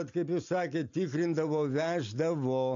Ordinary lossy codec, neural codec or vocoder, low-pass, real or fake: MP3, 64 kbps; none; 7.2 kHz; real